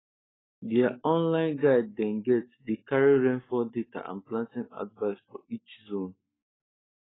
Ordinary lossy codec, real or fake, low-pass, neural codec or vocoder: AAC, 16 kbps; fake; 7.2 kHz; codec, 44.1 kHz, 7.8 kbps, Pupu-Codec